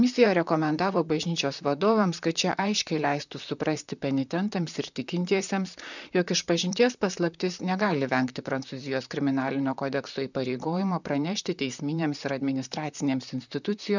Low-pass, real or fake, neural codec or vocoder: 7.2 kHz; fake; vocoder, 44.1 kHz, 128 mel bands, Pupu-Vocoder